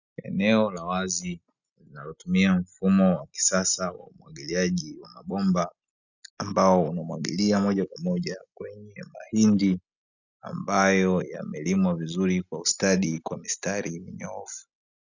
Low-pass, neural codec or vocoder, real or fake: 7.2 kHz; none; real